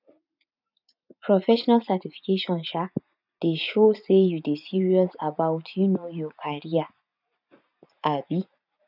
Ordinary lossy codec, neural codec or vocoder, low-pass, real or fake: none; vocoder, 44.1 kHz, 80 mel bands, Vocos; 5.4 kHz; fake